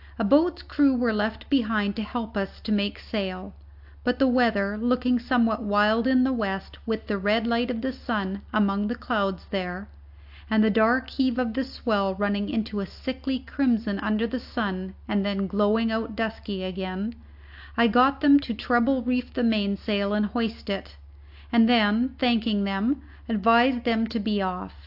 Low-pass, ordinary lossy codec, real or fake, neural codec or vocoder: 5.4 kHz; AAC, 48 kbps; real; none